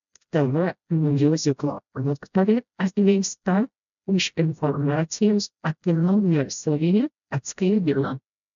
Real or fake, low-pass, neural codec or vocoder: fake; 7.2 kHz; codec, 16 kHz, 0.5 kbps, FreqCodec, smaller model